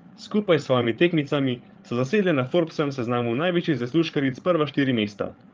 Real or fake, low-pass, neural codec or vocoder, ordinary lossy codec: fake; 7.2 kHz; codec, 16 kHz, 8 kbps, FreqCodec, larger model; Opus, 32 kbps